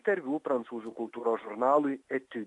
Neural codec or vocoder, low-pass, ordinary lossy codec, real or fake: none; 10.8 kHz; AAC, 64 kbps; real